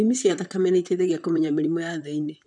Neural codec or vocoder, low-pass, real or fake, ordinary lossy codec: vocoder, 44.1 kHz, 128 mel bands, Pupu-Vocoder; 10.8 kHz; fake; none